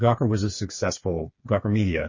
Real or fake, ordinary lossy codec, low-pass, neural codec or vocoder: fake; MP3, 32 kbps; 7.2 kHz; codec, 16 kHz, 4 kbps, FreqCodec, smaller model